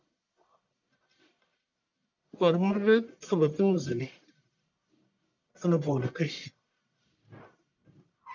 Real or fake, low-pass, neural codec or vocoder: fake; 7.2 kHz; codec, 44.1 kHz, 1.7 kbps, Pupu-Codec